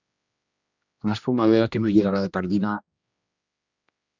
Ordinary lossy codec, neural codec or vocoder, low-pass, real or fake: Opus, 64 kbps; codec, 16 kHz, 1 kbps, X-Codec, HuBERT features, trained on general audio; 7.2 kHz; fake